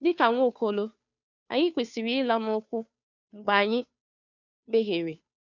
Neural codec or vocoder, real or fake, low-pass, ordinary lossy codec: codec, 16 kHz, 2 kbps, FunCodec, trained on Chinese and English, 25 frames a second; fake; 7.2 kHz; none